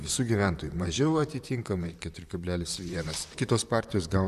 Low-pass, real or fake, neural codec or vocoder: 14.4 kHz; fake; vocoder, 44.1 kHz, 128 mel bands, Pupu-Vocoder